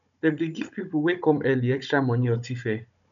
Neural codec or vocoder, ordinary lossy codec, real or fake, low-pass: codec, 16 kHz, 16 kbps, FunCodec, trained on Chinese and English, 50 frames a second; MP3, 96 kbps; fake; 7.2 kHz